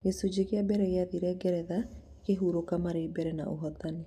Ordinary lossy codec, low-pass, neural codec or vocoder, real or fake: none; 14.4 kHz; none; real